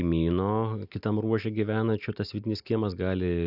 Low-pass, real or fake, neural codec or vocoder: 5.4 kHz; real; none